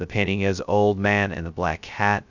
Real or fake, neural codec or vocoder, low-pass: fake; codec, 16 kHz, 0.2 kbps, FocalCodec; 7.2 kHz